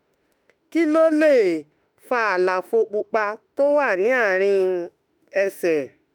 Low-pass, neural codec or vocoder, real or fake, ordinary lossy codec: none; autoencoder, 48 kHz, 32 numbers a frame, DAC-VAE, trained on Japanese speech; fake; none